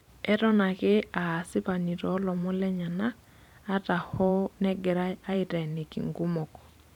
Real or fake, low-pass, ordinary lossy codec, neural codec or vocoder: fake; 19.8 kHz; none; vocoder, 44.1 kHz, 128 mel bands every 512 samples, BigVGAN v2